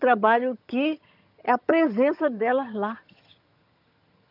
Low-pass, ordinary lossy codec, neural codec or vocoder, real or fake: 5.4 kHz; none; vocoder, 22.05 kHz, 80 mel bands, HiFi-GAN; fake